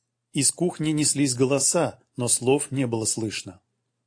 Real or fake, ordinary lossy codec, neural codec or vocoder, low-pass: real; AAC, 48 kbps; none; 9.9 kHz